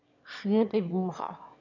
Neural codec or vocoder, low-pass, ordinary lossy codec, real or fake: autoencoder, 22.05 kHz, a latent of 192 numbers a frame, VITS, trained on one speaker; 7.2 kHz; none; fake